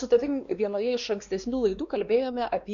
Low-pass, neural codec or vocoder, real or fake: 7.2 kHz; codec, 16 kHz, 2 kbps, X-Codec, WavLM features, trained on Multilingual LibriSpeech; fake